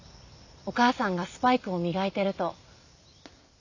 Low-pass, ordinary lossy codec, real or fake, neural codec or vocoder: 7.2 kHz; none; real; none